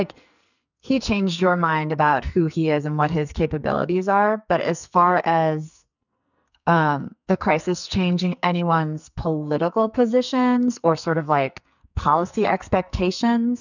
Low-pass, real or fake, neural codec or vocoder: 7.2 kHz; fake; codec, 44.1 kHz, 2.6 kbps, SNAC